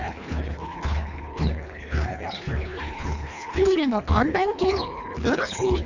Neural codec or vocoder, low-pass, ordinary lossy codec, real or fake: codec, 24 kHz, 1.5 kbps, HILCodec; 7.2 kHz; none; fake